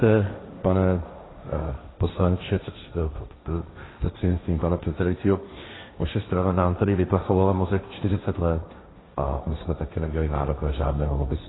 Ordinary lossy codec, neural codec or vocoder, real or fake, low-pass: AAC, 16 kbps; codec, 16 kHz, 1.1 kbps, Voila-Tokenizer; fake; 7.2 kHz